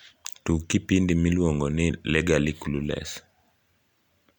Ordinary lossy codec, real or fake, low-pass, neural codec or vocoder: MP3, 96 kbps; real; 19.8 kHz; none